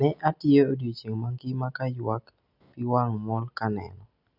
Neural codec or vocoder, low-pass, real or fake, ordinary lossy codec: none; 5.4 kHz; real; none